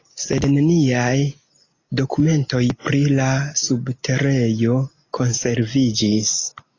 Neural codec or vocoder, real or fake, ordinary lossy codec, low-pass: none; real; AAC, 32 kbps; 7.2 kHz